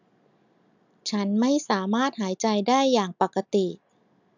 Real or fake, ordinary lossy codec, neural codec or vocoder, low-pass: real; none; none; 7.2 kHz